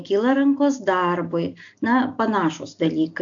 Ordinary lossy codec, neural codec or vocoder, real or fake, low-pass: AAC, 64 kbps; none; real; 7.2 kHz